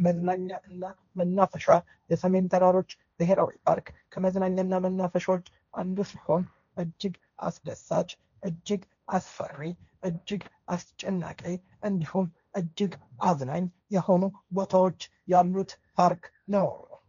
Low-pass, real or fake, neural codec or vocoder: 7.2 kHz; fake; codec, 16 kHz, 1.1 kbps, Voila-Tokenizer